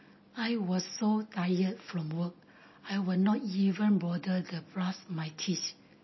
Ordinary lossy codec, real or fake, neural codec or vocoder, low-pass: MP3, 24 kbps; real; none; 7.2 kHz